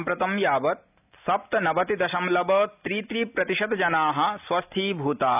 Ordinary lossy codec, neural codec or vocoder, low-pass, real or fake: none; none; 3.6 kHz; real